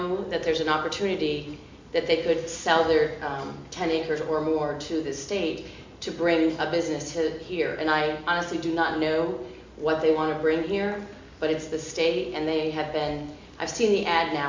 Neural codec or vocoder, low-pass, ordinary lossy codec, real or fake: none; 7.2 kHz; MP3, 64 kbps; real